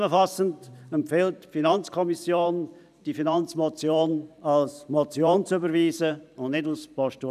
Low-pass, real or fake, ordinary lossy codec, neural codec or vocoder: 14.4 kHz; fake; none; vocoder, 44.1 kHz, 128 mel bands every 512 samples, BigVGAN v2